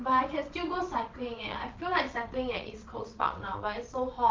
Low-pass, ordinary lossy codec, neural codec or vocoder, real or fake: 7.2 kHz; Opus, 24 kbps; vocoder, 22.05 kHz, 80 mel bands, WaveNeXt; fake